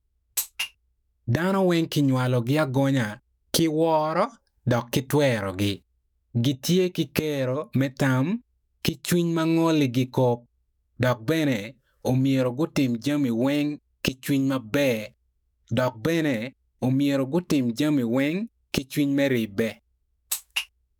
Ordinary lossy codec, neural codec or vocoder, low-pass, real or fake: none; autoencoder, 48 kHz, 128 numbers a frame, DAC-VAE, trained on Japanese speech; none; fake